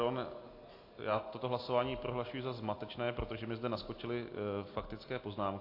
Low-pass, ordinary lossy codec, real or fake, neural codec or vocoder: 5.4 kHz; AAC, 32 kbps; real; none